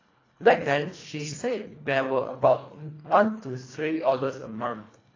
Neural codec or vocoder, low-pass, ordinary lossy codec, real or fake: codec, 24 kHz, 1.5 kbps, HILCodec; 7.2 kHz; AAC, 32 kbps; fake